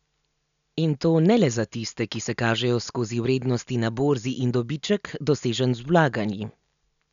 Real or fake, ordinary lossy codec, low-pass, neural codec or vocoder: real; none; 7.2 kHz; none